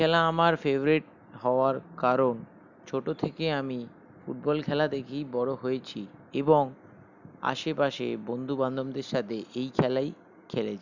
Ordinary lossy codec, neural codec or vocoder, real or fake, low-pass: none; none; real; 7.2 kHz